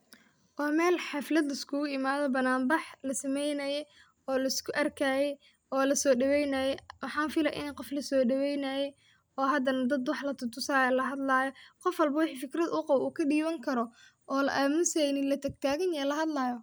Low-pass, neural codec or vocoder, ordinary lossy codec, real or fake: none; none; none; real